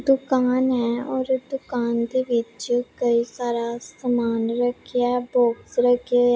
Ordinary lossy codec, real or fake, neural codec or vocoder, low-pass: none; real; none; none